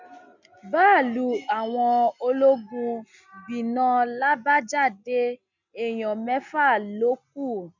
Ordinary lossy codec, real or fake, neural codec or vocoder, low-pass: none; real; none; 7.2 kHz